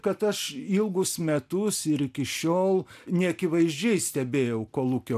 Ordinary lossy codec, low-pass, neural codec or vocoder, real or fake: AAC, 96 kbps; 14.4 kHz; none; real